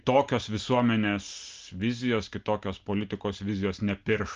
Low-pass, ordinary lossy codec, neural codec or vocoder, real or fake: 7.2 kHz; Opus, 32 kbps; none; real